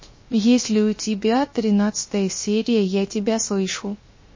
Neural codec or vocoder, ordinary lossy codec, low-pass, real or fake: codec, 16 kHz, 0.3 kbps, FocalCodec; MP3, 32 kbps; 7.2 kHz; fake